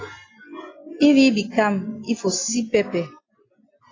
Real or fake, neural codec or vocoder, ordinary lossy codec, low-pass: real; none; AAC, 32 kbps; 7.2 kHz